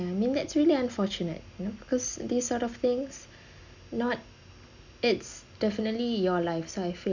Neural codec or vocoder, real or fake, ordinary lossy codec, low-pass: none; real; none; 7.2 kHz